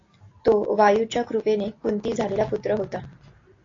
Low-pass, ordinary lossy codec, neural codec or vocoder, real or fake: 7.2 kHz; AAC, 32 kbps; none; real